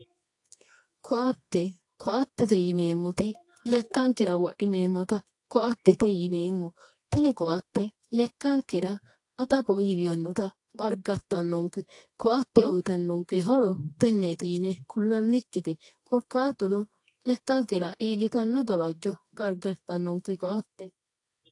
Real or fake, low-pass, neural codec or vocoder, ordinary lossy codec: fake; 10.8 kHz; codec, 24 kHz, 0.9 kbps, WavTokenizer, medium music audio release; AAC, 48 kbps